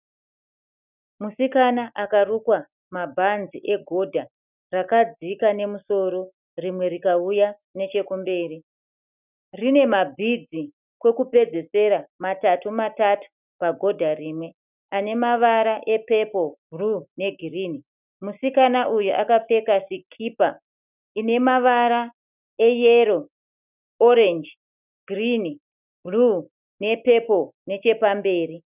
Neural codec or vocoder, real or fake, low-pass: none; real; 3.6 kHz